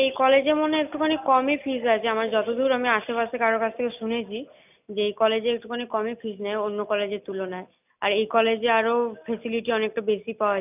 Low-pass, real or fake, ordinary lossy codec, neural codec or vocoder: 3.6 kHz; real; none; none